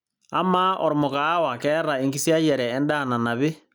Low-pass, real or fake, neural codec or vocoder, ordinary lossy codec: none; real; none; none